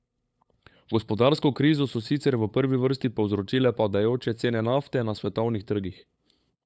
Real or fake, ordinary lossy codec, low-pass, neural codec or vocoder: fake; none; none; codec, 16 kHz, 8 kbps, FunCodec, trained on LibriTTS, 25 frames a second